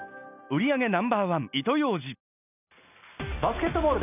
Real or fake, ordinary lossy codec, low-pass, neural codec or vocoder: real; none; 3.6 kHz; none